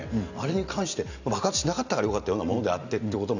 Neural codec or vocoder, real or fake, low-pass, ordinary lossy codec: none; real; 7.2 kHz; none